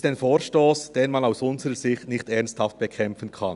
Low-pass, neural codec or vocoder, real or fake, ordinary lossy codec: 10.8 kHz; none; real; none